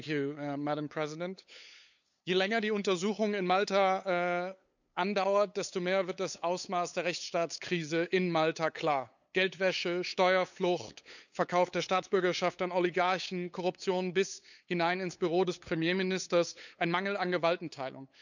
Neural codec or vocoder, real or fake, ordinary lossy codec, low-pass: codec, 16 kHz, 8 kbps, FunCodec, trained on LibriTTS, 25 frames a second; fake; none; 7.2 kHz